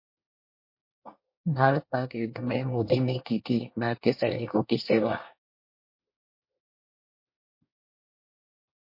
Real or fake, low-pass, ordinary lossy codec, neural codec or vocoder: fake; 5.4 kHz; MP3, 32 kbps; codec, 24 kHz, 1 kbps, SNAC